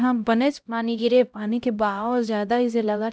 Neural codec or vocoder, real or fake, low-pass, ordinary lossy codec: codec, 16 kHz, 0.5 kbps, X-Codec, HuBERT features, trained on LibriSpeech; fake; none; none